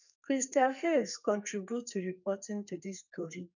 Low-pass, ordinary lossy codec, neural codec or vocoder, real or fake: 7.2 kHz; none; codec, 32 kHz, 1.9 kbps, SNAC; fake